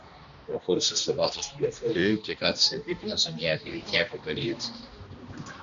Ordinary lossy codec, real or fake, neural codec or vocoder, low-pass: MP3, 96 kbps; fake; codec, 16 kHz, 1 kbps, X-Codec, HuBERT features, trained on balanced general audio; 7.2 kHz